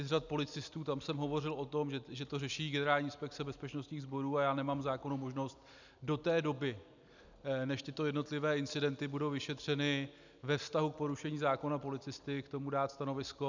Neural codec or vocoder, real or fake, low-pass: none; real; 7.2 kHz